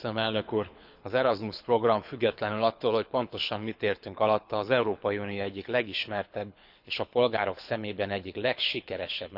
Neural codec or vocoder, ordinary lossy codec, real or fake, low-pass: codec, 24 kHz, 6 kbps, HILCodec; none; fake; 5.4 kHz